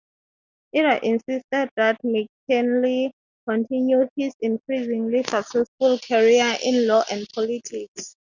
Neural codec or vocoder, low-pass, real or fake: none; 7.2 kHz; real